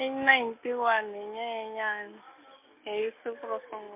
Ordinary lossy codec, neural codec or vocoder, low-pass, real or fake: MP3, 32 kbps; none; 3.6 kHz; real